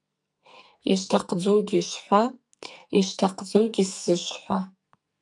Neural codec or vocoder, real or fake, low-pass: codec, 44.1 kHz, 2.6 kbps, SNAC; fake; 10.8 kHz